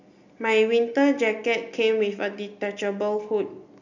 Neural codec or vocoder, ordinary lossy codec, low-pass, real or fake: none; none; 7.2 kHz; real